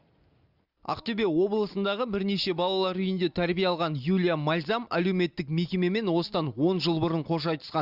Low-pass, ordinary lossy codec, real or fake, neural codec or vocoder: 5.4 kHz; Opus, 64 kbps; real; none